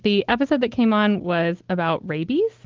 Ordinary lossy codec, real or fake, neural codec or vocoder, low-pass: Opus, 16 kbps; real; none; 7.2 kHz